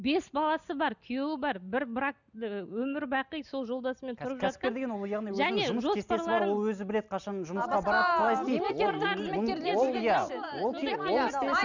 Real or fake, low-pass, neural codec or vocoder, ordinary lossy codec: real; 7.2 kHz; none; none